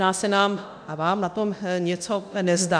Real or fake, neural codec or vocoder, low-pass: fake; codec, 24 kHz, 0.9 kbps, DualCodec; 9.9 kHz